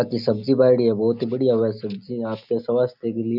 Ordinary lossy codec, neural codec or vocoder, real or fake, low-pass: none; none; real; 5.4 kHz